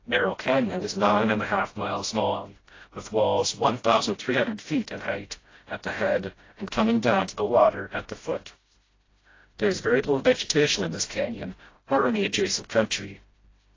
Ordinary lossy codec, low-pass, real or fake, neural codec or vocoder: AAC, 32 kbps; 7.2 kHz; fake; codec, 16 kHz, 0.5 kbps, FreqCodec, smaller model